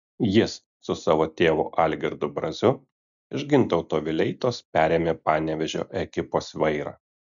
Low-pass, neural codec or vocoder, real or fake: 7.2 kHz; none; real